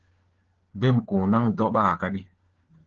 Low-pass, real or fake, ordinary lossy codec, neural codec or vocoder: 7.2 kHz; fake; Opus, 16 kbps; codec, 16 kHz, 4 kbps, FunCodec, trained on LibriTTS, 50 frames a second